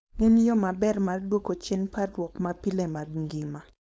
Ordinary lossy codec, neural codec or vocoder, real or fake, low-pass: none; codec, 16 kHz, 4.8 kbps, FACodec; fake; none